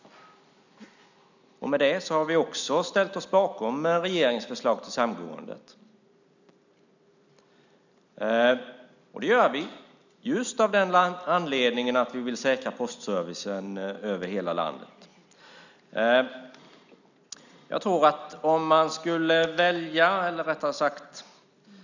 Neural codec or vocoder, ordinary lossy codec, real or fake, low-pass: none; none; real; 7.2 kHz